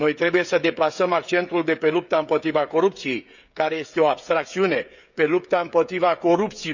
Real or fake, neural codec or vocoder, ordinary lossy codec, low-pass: fake; codec, 16 kHz, 16 kbps, FreqCodec, smaller model; none; 7.2 kHz